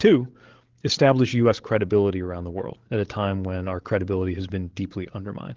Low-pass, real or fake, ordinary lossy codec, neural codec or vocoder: 7.2 kHz; fake; Opus, 16 kbps; codec, 16 kHz, 16 kbps, FreqCodec, larger model